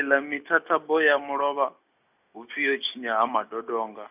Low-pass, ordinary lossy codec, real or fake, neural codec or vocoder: 3.6 kHz; none; real; none